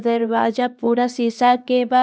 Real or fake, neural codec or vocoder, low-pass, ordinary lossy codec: fake; codec, 16 kHz, 2 kbps, X-Codec, HuBERT features, trained on LibriSpeech; none; none